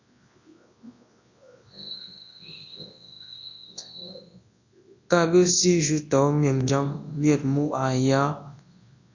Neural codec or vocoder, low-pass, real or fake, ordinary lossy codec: codec, 24 kHz, 0.9 kbps, WavTokenizer, large speech release; 7.2 kHz; fake; AAC, 48 kbps